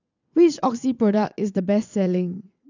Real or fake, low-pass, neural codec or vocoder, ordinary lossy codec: fake; 7.2 kHz; vocoder, 22.05 kHz, 80 mel bands, WaveNeXt; none